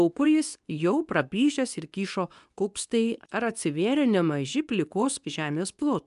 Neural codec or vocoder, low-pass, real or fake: codec, 24 kHz, 0.9 kbps, WavTokenizer, medium speech release version 2; 10.8 kHz; fake